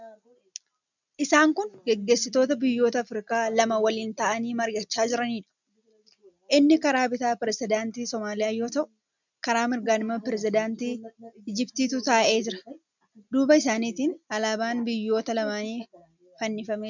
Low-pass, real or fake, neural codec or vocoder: 7.2 kHz; real; none